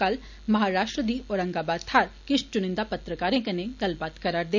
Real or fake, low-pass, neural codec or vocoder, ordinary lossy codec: real; 7.2 kHz; none; none